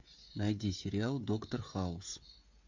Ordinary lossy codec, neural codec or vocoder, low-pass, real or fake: MP3, 48 kbps; codec, 16 kHz, 16 kbps, FreqCodec, smaller model; 7.2 kHz; fake